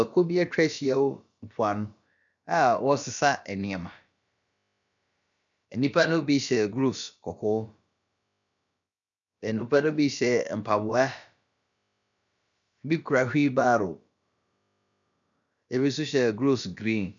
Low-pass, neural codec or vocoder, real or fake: 7.2 kHz; codec, 16 kHz, about 1 kbps, DyCAST, with the encoder's durations; fake